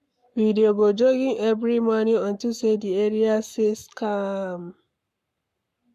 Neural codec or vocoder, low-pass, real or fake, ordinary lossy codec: codec, 44.1 kHz, 7.8 kbps, Pupu-Codec; 14.4 kHz; fake; none